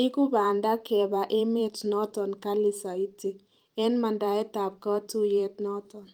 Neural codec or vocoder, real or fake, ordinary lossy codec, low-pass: vocoder, 44.1 kHz, 128 mel bands every 512 samples, BigVGAN v2; fake; Opus, 32 kbps; 19.8 kHz